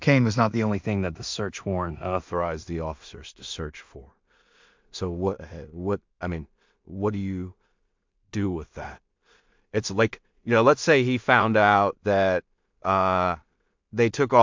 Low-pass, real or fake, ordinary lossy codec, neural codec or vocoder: 7.2 kHz; fake; MP3, 64 kbps; codec, 16 kHz in and 24 kHz out, 0.4 kbps, LongCat-Audio-Codec, two codebook decoder